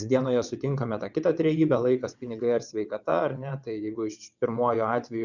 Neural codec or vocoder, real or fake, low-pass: vocoder, 22.05 kHz, 80 mel bands, WaveNeXt; fake; 7.2 kHz